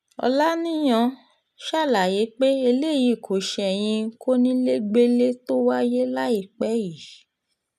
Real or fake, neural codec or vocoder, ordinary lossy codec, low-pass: real; none; none; 14.4 kHz